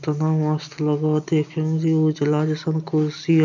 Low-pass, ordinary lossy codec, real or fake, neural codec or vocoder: 7.2 kHz; none; real; none